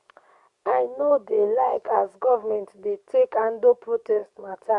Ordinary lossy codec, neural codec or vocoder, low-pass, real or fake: Opus, 64 kbps; codec, 44.1 kHz, 7.8 kbps, DAC; 10.8 kHz; fake